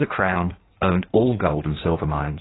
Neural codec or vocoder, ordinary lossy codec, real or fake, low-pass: codec, 16 kHz in and 24 kHz out, 1.1 kbps, FireRedTTS-2 codec; AAC, 16 kbps; fake; 7.2 kHz